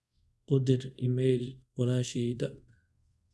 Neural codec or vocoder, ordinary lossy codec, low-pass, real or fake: codec, 24 kHz, 0.5 kbps, DualCodec; none; none; fake